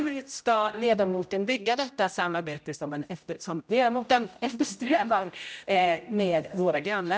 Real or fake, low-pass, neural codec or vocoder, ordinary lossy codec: fake; none; codec, 16 kHz, 0.5 kbps, X-Codec, HuBERT features, trained on general audio; none